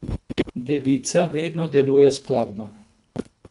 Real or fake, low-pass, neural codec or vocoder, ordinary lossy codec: fake; 10.8 kHz; codec, 24 kHz, 1.5 kbps, HILCodec; none